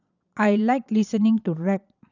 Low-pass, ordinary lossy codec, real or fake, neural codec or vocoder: 7.2 kHz; none; fake; vocoder, 22.05 kHz, 80 mel bands, Vocos